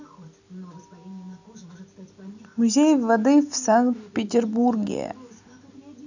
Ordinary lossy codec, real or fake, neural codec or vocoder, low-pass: none; real; none; 7.2 kHz